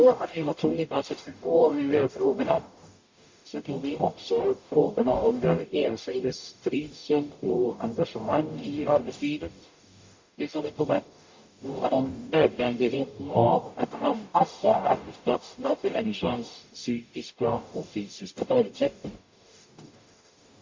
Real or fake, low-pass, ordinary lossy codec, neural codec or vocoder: fake; 7.2 kHz; MP3, 48 kbps; codec, 44.1 kHz, 0.9 kbps, DAC